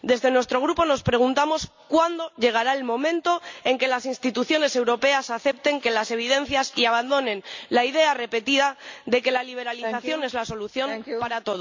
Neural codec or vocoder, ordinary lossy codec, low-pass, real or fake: none; MP3, 64 kbps; 7.2 kHz; real